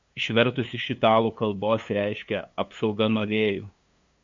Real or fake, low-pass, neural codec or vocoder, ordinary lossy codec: fake; 7.2 kHz; codec, 16 kHz, 2 kbps, FunCodec, trained on LibriTTS, 25 frames a second; MP3, 64 kbps